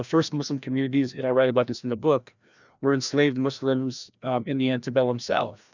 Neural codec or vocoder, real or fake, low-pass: codec, 16 kHz, 1 kbps, FreqCodec, larger model; fake; 7.2 kHz